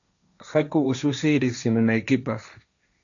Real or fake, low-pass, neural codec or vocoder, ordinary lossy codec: fake; 7.2 kHz; codec, 16 kHz, 1.1 kbps, Voila-Tokenizer; MP3, 96 kbps